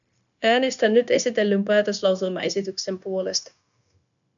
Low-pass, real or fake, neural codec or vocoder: 7.2 kHz; fake; codec, 16 kHz, 0.9 kbps, LongCat-Audio-Codec